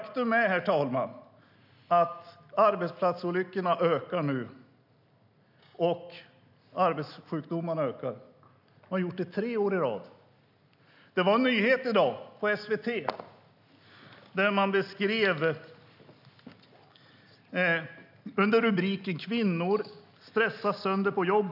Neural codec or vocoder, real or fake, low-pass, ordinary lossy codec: none; real; 5.4 kHz; none